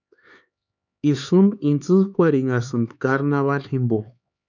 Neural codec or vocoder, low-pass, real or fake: codec, 16 kHz, 4 kbps, X-Codec, HuBERT features, trained on LibriSpeech; 7.2 kHz; fake